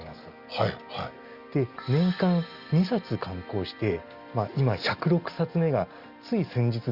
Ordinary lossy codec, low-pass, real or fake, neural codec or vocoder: Opus, 64 kbps; 5.4 kHz; real; none